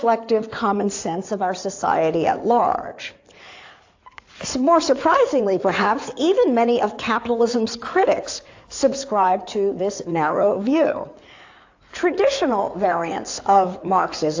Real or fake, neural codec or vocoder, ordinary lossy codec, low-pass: fake; codec, 16 kHz in and 24 kHz out, 2.2 kbps, FireRedTTS-2 codec; AAC, 48 kbps; 7.2 kHz